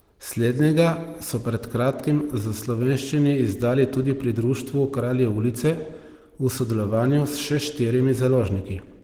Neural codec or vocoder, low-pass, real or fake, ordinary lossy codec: none; 19.8 kHz; real; Opus, 16 kbps